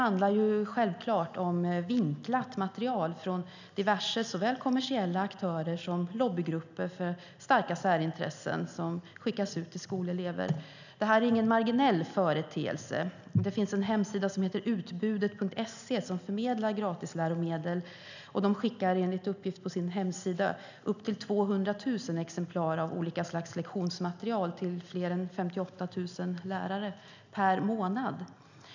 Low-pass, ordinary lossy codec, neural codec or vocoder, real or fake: 7.2 kHz; none; none; real